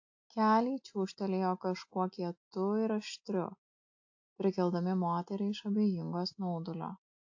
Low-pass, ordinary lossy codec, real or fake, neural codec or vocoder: 7.2 kHz; AAC, 48 kbps; real; none